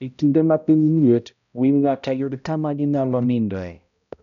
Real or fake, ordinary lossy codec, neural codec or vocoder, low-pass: fake; none; codec, 16 kHz, 0.5 kbps, X-Codec, HuBERT features, trained on balanced general audio; 7.2 kHz